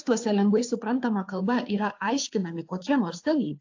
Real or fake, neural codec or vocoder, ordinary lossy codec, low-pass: fake; codec, 16 kHz, 2 kbps, FunCodec, trained on Chinese and English, 25 frames a second; AAC, 48 kbps; 7.2 kHz